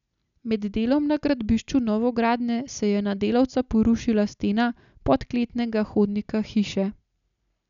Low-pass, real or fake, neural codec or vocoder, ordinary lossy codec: 7.2 kHz; real; none; none